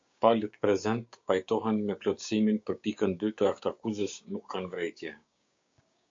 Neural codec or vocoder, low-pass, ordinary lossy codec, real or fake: codec, 16 kHz, 6 kbps, DAC; 7.2 kHz; MP3, 48 kbps; fake